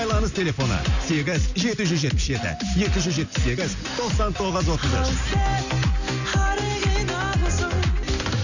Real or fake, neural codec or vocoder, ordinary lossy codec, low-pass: real; none; none; 7.2 kHz